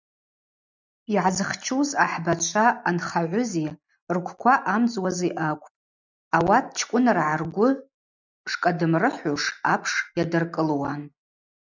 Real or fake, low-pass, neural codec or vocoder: real; 7.2 kHz; none